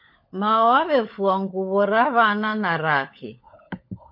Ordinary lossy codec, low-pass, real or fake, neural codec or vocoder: MP3, 32 kbps; 5.4 kHz; fake; codec, 16 kHz, 16 kbps, FunCodec, trained on LibriTTS, 50 frames a second